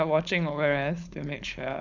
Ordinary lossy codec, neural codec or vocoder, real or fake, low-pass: none; codec, 16 kHz, 4.8 kbps, FACodec; fake; 7.2 kHz